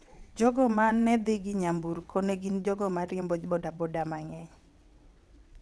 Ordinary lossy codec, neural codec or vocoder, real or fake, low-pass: none; vocoder, 22.05 kHz, 80 mel bands, WaveNeXt; fake; none